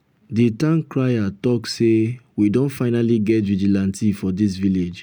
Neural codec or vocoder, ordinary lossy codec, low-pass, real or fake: none; none; none; real